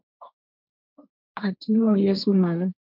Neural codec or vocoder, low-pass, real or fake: codec, 16 kHz, 1.1 kbps, Voila-Tokenizer; 5.4 kHz; fake